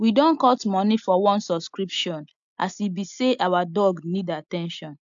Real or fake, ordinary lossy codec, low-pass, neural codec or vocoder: real; AAC, 64 kbps; 7.2 kHz; none